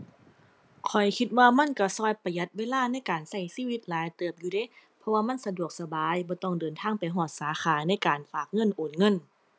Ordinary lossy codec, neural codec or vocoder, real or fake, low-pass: none; none; real; none